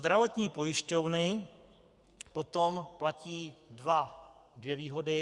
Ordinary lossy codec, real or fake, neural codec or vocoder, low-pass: Opus, 64 kbps; fake; codec, 44.1 kHz, 2.6 kbps, SNAC; 10.8 kHz